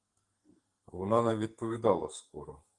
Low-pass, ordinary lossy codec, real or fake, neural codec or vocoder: 10.8 kHz; Opus, 24 kbps; fake; codec, 44.1 kHz, 2.6 kbps, SNAC